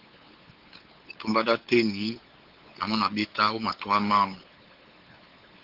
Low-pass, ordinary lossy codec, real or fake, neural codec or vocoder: 5.4 kHz; Opus, 16 kbps; fake; codec, 16 kHz, 8 kbps, FunCodec, trained on LibriTTS, 25 frames a second